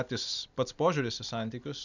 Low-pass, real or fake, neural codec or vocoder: 7.2 kHz; real; none